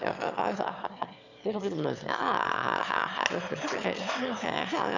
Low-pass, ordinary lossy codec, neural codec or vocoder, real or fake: 7.2 kHz; none; autoencoder, 22.05 kHz, a latent of 192 numbers a frame, VITS, trained on one speaker; fake